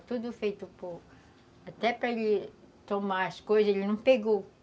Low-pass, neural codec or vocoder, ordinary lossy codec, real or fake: none; none; none; real